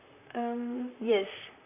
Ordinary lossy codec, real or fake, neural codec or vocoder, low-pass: none; fake; vocoder, 44.1 kHz, 128 mel bands, Pupu-Vocoder; 3.6 kHz